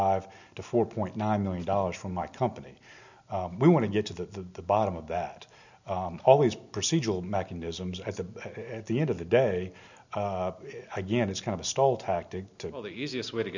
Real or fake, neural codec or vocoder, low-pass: real; none; 7.2 kHz